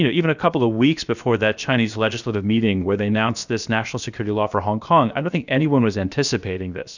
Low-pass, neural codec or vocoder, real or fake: 7.2 kHz; codec, 16 kHz, about 1 kbps, DyCAST, with the encoder's durations; fake